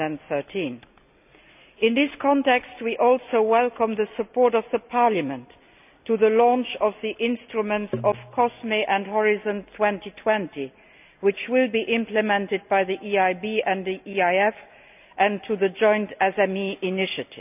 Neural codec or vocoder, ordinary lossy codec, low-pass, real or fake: none; none; 3.6 kHz; real